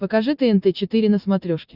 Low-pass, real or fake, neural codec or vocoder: 5.4 kHz; real; none